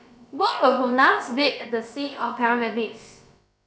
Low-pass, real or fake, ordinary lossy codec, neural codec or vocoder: none; fake; none; codec, 16 kHz, about 1 kbps, DyCAST, with the encoder's durations